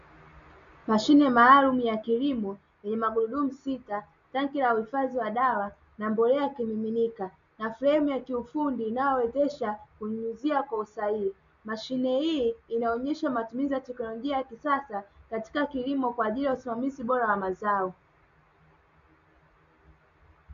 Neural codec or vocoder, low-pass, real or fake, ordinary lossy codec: none; 7.2 kHz; real; AAC, 96 kbps